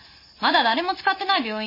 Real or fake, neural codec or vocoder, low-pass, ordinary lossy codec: real; none; 5.4 kHz; MP3, 24 kbps